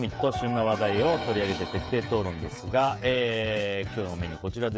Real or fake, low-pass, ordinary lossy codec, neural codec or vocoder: fake; none; none; codec, 16 kHz, 16 kbps, FreqCodec, smaller model